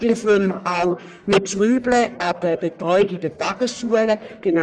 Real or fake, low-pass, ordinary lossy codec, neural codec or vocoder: fake; 9.9 kHz; none; codec, 44.1 kHz, 1.7 kbps, Pupu-Codec